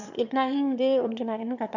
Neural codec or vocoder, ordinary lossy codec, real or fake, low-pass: autoencoder, 22.05 kHz, a latent of 192 numbers a frame, VITS, trained on one speaker; none; fake; 7.2 kHz